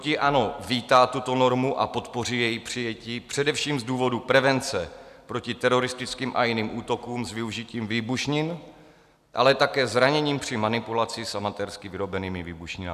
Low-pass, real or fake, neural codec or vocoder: 14.4 kHz; real; none